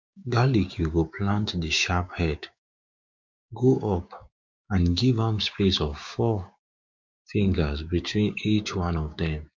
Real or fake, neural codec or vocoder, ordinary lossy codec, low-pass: fake; codec, 16 kHz, 6 kbps, DAC; MP3, 64 kbps; 7.2 kHz